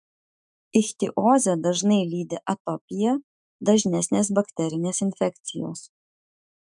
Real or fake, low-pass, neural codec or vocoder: fake; 10.8 kHz; autoencoder, 48 kHz, 128 numbers a frame, DAC-VAE, trained on Japanese speech